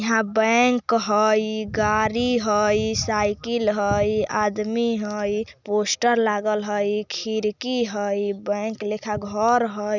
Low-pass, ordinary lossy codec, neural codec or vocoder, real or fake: 7.2 kHz; none; none; real